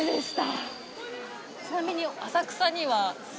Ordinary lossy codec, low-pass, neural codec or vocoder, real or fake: none; none; none; real